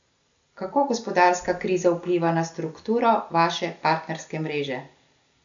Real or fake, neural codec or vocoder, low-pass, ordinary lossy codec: real; none; 7.2 kHz; MP3, 64 kbps